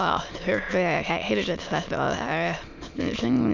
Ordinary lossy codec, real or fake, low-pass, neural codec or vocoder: none; fake; 7.2 kHz; autoencoder, 22.05 kHz, a latent of 192 numbers a frame, VITS, trained on many speakers